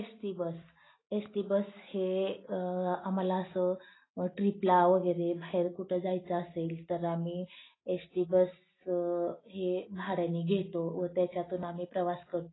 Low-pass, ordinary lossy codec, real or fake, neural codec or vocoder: 7.2 kHz; AAC, 16 kbps; real; none